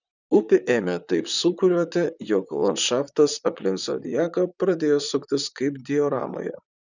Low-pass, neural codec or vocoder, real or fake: 7.2 kHz; vocoder, 44.1 kHz, 128 mel bands, Pupu-Vocoder; fake